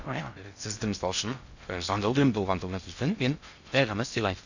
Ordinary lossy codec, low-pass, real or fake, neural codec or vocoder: none; 7.2 kHz; fake; codec, 16 kHz in and 24 kHz out, 0.6 kbps, FocalCodec, streaming, 2048 codes